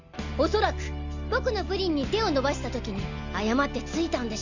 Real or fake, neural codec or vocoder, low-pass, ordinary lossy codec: real; none; 7.2 kHz; Opus, 64 kbps